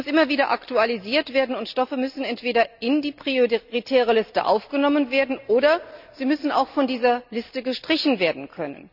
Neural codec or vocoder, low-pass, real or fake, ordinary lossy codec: none; 5.4 kHz; real; none